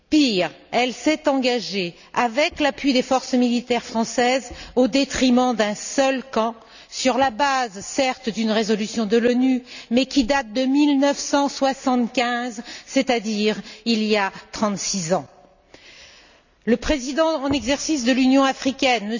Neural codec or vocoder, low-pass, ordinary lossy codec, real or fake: none; 7.2 kHz; none; real